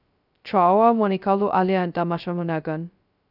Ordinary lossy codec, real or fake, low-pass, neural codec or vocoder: none; fake; 5.4 kHz; codec, 16 kHz, 0.2 kbps, FocalCodec